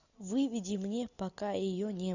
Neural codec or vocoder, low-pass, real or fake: none; 7.2 kHz; real